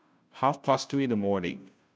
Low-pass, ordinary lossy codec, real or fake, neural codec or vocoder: none; none; fake; codec, 16 kHz, 0.5 kbps, FunCodec, trained on Chinese and English, 25 frames a second